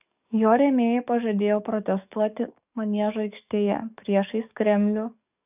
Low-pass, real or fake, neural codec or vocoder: 3.6 kHz; fake; codec, 44.1 kHz, 7.8 kbps, DAC